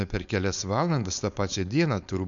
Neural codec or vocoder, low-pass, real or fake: codec, 16 kHz, 4.8 kbps, FACodec; 7.2 kHz; fake